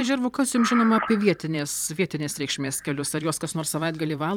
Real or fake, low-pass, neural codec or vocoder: fake; 19.8 kHz; vocoder, 44.1 kHz, 128 mel bands every 512 samples, BigVGAN v2